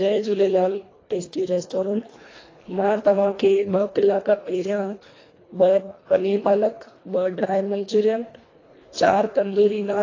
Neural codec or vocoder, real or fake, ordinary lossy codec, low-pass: codec, 24 kHz, 1.5 kbps, HILCodec; fake; AAC, 32 kbps; 7.2 kHz